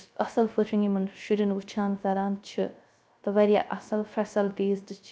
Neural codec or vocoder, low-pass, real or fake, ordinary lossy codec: codec, 16 kHz, 0.3 kbps, FocalCodec; none; fake; none